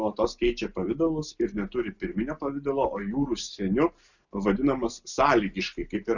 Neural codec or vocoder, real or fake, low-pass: none; real; 7.2 kHz